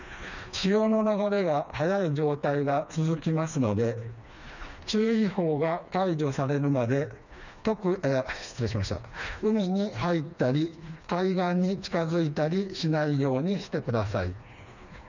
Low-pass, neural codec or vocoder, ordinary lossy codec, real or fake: 7.2 kHz; codec, 16 kHz, 2 kbps, FreqCodec, smaller model; none; fake